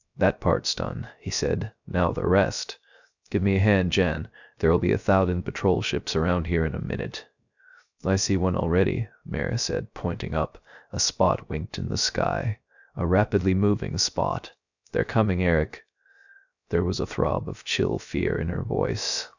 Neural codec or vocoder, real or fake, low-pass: codec, 16 kHz, 0.3 kbps, FocalCodec; fake; 7.2 kHz